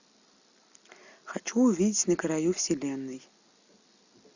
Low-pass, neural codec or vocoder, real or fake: 7.2 kHz; none; real